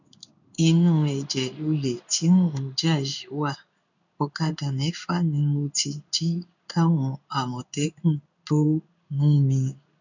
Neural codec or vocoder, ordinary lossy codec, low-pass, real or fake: codec, 16 kHz in and 24 kHz out, 1 kbps, XY-Tokenizer; none; 7.2 kHz; fake